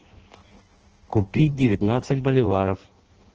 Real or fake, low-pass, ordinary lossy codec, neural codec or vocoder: fake; 7.2 kHz; Opus, 16 kbps; codec, 16 kHz in and 24 kHz out, 0.6 kbps, FireRedTTS-2 codec